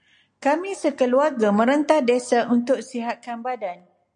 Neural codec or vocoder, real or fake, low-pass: none; real; 9.9 kHz